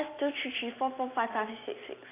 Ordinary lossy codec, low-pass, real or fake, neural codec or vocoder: AAC, 24 kbps; 3.6 kHz; real; none